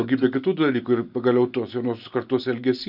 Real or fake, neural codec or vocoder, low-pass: real; none; 5.4 kHz